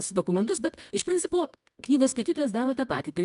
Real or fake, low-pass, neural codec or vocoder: fake; 10.8 kHz; codec, 24 kHz, 0.9 kbps, WavTokenizer, medium music audio release